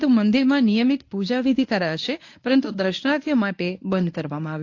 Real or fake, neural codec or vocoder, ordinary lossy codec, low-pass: fake; codec, 24 kHz, 0.9 kbps, WavTokenizer, medium speech release version 1; AAC, 48 kbps; 7.2 kHz